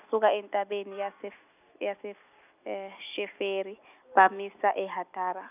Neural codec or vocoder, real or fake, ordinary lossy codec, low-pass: none; real; none; 3.6 kHz